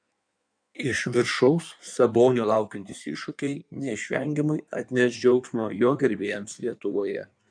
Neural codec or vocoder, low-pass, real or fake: codec, 16 kHz in and 24 kHz out, 1.1 kbps, FireRedTTS-2 codec; 9.9 kHz; fake